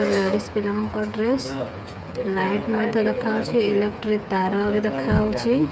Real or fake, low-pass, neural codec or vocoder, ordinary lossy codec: fake; none; codec, 16 kHz, 8 kbps, FreqCodec, smaller model; none